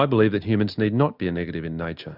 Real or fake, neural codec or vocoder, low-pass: real; none; 5.4 kHz